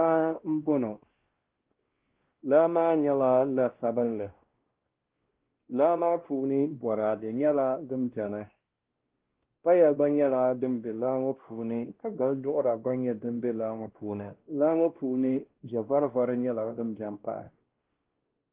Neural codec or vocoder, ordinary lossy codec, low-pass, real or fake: codec, 16 kHz, 1 kbps, X-Codec, WavLM features, trained on Multilingual LibriSpeech; Opus, 16 kbps; 3.6 kHz; fake